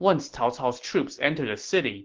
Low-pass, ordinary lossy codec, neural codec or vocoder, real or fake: 7.2 kHz; Opus, 16 kbps; none; real